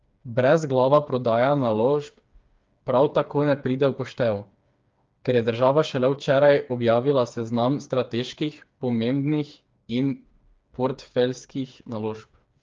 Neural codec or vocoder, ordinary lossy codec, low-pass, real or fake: codec, 16 kHz, 4 kbps, FreqCodec, smaller model; Opus, 24 kbps; 7.2 kHz; fake